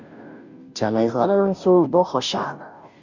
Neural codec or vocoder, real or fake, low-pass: codec, 16 kHz, 0.5 kbps, FunCodec, trained on Chinese and English, 25 frames a second; fake; 7.2 kHz